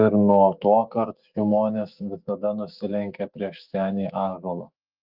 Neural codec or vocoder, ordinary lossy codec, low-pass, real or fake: autoencoder, 48 kHz, 128 numbers a frame, DAC-VAE, trained on Japanese speech; Opus, 24 kbps; 5.4 kHz; fake